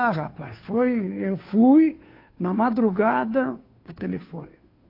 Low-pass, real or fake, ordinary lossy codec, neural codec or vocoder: 5.4 kHz; fake; AAC, 32 kbps; codec, 16 kHz, 2 kbps, FunCodec, trained on Chinese and English, 25 frames a second